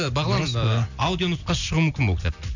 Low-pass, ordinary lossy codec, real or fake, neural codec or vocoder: 7.2 kHz; none; real; none